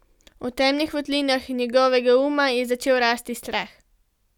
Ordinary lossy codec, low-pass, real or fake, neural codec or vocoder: none; 19.8 kHz; real; none